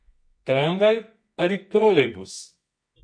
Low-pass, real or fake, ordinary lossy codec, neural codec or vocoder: 9.9 kHz; fake; MP3, 48 kbps; codec, 24 kHz, 0.9 kbps, WavTokenizer, medium music audio release